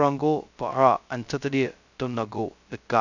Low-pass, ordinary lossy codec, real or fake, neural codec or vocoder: 7.2 kHz; none; fake; codec, 16 kHz, 0.2 kbps, FocalCodec